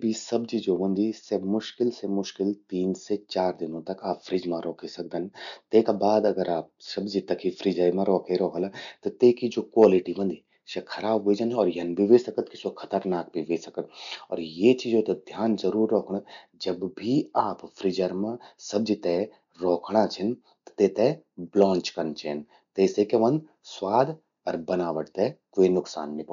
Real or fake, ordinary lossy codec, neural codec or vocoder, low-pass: real; none; none; 7.2 kHz